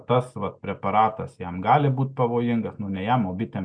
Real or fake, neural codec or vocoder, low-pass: real; none; 9.9 kHz